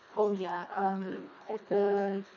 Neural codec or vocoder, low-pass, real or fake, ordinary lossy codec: codec, 24 kHz, 1.5 kbps, HILCodec; 7.2 kHz; fake; none